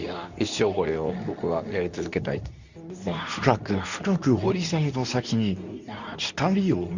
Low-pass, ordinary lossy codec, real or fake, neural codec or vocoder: 7.2 kHz; Opus, 64 kbps; fake; codec, 24 kHz, 0.9 kbps, WavTokenizer, medium speech release version 2